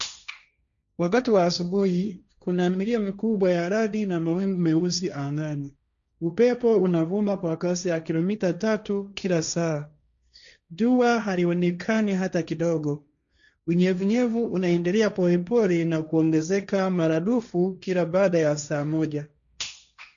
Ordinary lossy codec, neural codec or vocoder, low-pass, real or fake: none; codec, 16 kHz, 1.1 kbps, Voila-Tokenizer; 7.2 kHz; fake